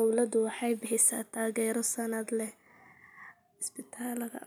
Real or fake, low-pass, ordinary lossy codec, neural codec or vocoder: real; none; none; none